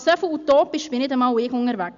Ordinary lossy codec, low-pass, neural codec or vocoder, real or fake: none; 7.2 kHz; none; real